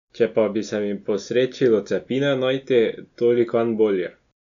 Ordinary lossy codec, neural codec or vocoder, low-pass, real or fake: none; none; 7.2 kHz; real